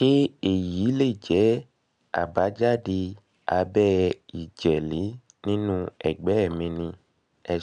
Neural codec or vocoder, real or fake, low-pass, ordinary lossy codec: none; real; 9.9 kHz; none